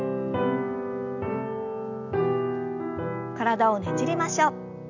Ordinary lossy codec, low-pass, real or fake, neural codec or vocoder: none; 7.2 kHz; real; none